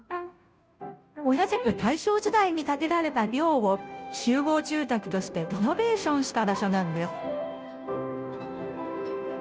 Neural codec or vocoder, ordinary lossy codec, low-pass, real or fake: codec, 16 kHz, 0.5 kbps, FunCodec, trained on Chinese and English, 25 frames a second; none; none; fake